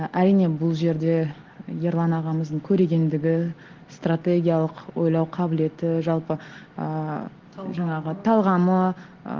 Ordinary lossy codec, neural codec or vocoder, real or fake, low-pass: Opus, 16 kbps; none; real; 7.2 kHz